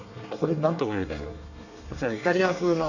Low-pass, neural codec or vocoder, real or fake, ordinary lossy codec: 7.2 kHz; codec, 24 kHz, 1 kbps, SNAC; fake; none